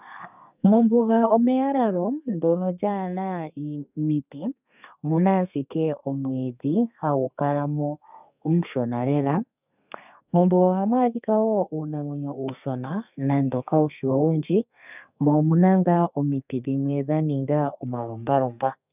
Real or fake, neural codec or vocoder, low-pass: fake; codec, 32 kHz, 1.9 kbps, SNAC; 3.6 kHz